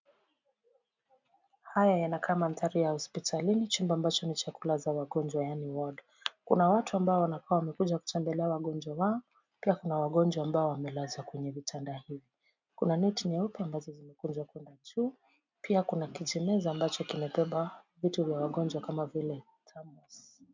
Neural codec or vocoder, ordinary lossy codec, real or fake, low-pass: none; MP3, 64 kbps; real; 7.2 kHz